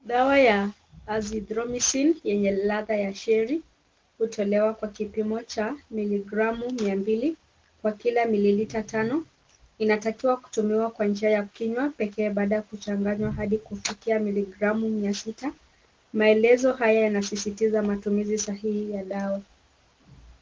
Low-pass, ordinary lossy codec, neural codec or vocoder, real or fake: 7.2 kHz; Opus, 16 kbps; none; real